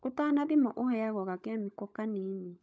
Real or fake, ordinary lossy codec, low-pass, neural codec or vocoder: fake; none; none; codec, 16 kHz, 16 kbps, FunCodec, trained on LibriTTS, 50 frames a second